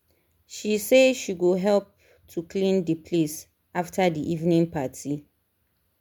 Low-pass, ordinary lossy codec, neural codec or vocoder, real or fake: 19.8 kHz; MP3, 96 kbps; none; real